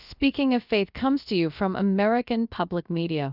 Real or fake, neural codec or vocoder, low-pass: fake; codec, 16 kHz, about 1 kbps, DyCAST, with the encoder's durations; 5.4 kHz